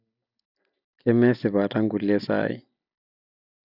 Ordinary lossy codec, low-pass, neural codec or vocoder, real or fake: none; 5.4 kHz; none; real